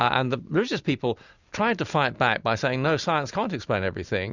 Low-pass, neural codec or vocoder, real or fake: 7.2 kHz; none; real